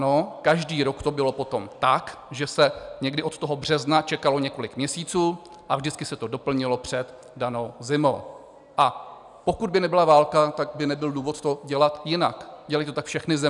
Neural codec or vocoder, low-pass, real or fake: none; 10.8 kHz; real